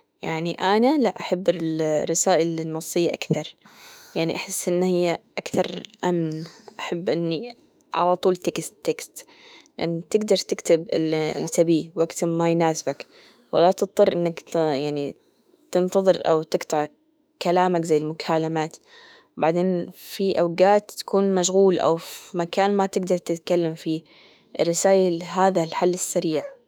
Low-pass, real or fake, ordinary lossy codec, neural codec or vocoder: none; fake; none; autoencoder, 48 kHz, 32 numbers a frame, DAC-VAE, trained on Japanese speech